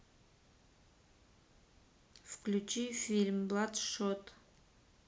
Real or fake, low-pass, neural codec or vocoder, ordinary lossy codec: real; none; none; none